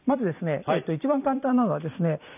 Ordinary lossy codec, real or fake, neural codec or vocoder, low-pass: none; fake; vocoder, 44.1 kHz, 128 mel bands every 256 samples, BigVGAN v2; 3.6 kHz